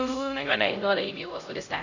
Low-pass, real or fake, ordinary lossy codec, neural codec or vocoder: 7.2 kHz; fake; none; codec, 16 kHz, 0.5 kbps, X-Codec, HuBERT features, trained on LibriSpeech